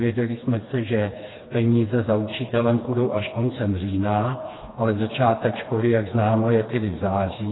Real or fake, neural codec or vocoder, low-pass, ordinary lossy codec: fake; codec, 16 kHz, 2 kbps, FreqCodec, smaller model; 7.2 kHz; AAC, 16 kbps